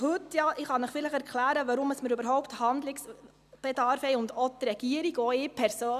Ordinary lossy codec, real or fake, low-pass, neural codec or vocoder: none; real; 14.4 kHz; none